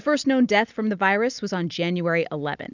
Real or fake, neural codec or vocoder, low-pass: real; none; 7.2 kHz